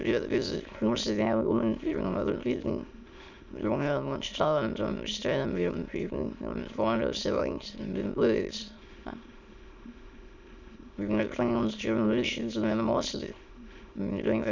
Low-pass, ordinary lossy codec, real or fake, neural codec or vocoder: 7.2 kHz; Opus, 64 kbps; fake; autoencoder, 22.05 kHz, a latent of 192 numbers a frame, VITS, trained on many speakers